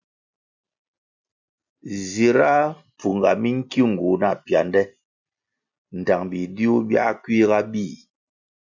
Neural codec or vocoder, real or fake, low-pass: none; real; 7.2 kHz